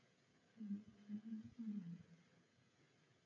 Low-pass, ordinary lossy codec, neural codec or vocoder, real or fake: 7.2 kHz; AAC, 48 kbps; codec, 16 kHz, 16 kbps, FreqCodec, smaller model; fake